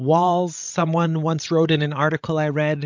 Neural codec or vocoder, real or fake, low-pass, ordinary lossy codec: codec, 16 kHz, 16 kbps, FreqCodec, larger model; fake; 7.2 kHz; MP3, 64 kbps